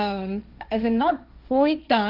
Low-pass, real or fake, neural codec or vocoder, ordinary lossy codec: 5.4 kHz; fake; codec, 16 kHz, 1.1 kbps, Voila-Tokenizer; none